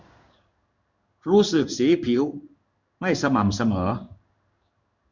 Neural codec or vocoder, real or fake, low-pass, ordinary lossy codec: codec, 16 kHz in and 24 kHz out, 1 kbps, XY-Tokenizer; fake; 7.2 kHz; none